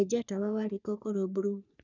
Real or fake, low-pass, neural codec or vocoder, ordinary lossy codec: fake; 7.2 kHz; codec, 44.1 kHz, 3.4 kbps, Pupu-Codec; none